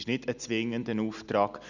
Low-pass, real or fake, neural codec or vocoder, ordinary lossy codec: 7.2 kHz; real; none; none